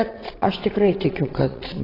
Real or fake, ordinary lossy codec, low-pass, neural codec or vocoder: fake; AAC, 24 kbps; 5.4 kHz; vocoder, 22.05 kHz, 80 mel bands, WaveNeXt